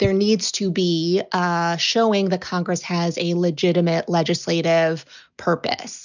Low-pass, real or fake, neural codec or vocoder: 7.2 kHz; real; none